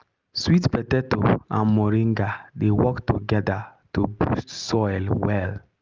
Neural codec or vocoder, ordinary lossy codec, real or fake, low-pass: none; Opus, 24 kbps; real; 7.2 kHz